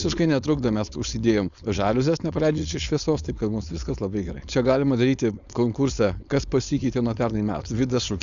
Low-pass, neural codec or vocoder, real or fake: 7.2 kHz; codec, 16 kHz, 4.8 kbps, FACodec; fake